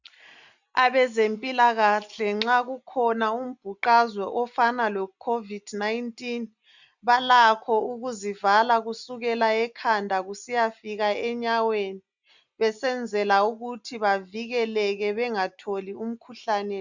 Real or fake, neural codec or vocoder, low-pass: real; none; 7.2 kHz